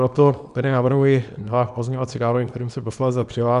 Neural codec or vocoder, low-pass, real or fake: codec, 24 kHz, 0.9 kbps, WavTokenizer, small release; 10.8 kHz; fake